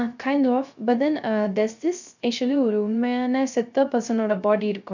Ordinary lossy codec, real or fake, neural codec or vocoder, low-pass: none; fake; codec, 16 kHz, 0.3 kbps, FocalCodec; 7.2 kHz